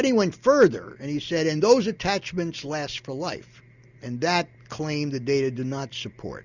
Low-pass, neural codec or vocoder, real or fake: 7.2 kHz; none; real